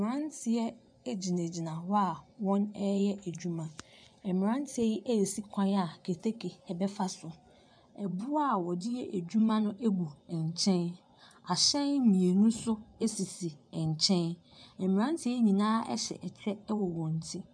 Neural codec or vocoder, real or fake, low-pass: vocoder, 24 kHz, 100 mel bands, Vocos; fake; 9.9 kHz